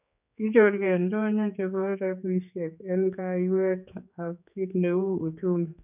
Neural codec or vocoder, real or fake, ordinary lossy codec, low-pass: codec, 16 kHz, 4 kbps, X-Codec, HuBERT features, trained on general audio; fake; none; 3.6 kHz